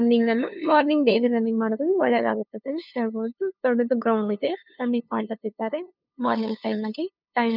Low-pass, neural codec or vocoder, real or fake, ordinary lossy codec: 5.4 kHz; codec, 16 kHz, 2 kbps, FunCodec, trained on LibriTTS, 25 frames a second; fake; none